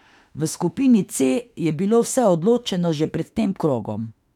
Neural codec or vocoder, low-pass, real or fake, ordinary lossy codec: autoencoder, 48 kHz, 32 numbers a frame, DAC-VAE, trained on Japanese speech; 19.8 kHz; fake; none